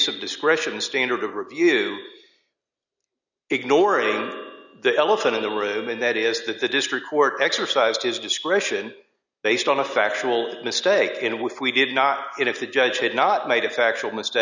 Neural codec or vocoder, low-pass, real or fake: none; 7.2 kHz; real